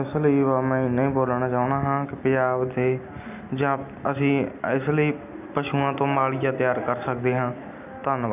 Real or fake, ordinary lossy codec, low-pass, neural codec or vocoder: real; none; 3.6 kHz; none